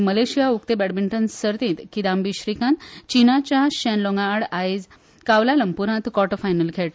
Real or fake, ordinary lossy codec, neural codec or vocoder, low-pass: real; none; none; none